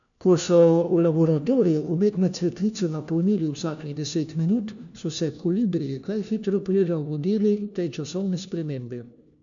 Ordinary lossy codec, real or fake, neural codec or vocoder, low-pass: none; fake; codec, 16 kHz, 1 kbps, FunCodec, trained on LibriTTS, 50 frames a second; 7.2 kHz